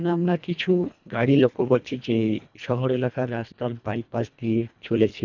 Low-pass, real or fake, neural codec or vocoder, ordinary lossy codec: 7.2 kHz; fake; codec, 24 kHz, 1.5 kbps, HILCodec; none